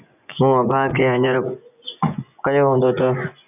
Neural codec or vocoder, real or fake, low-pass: vocoder, 24 kHz, 100 mel bands, Vocos; fake; 3.6 kHz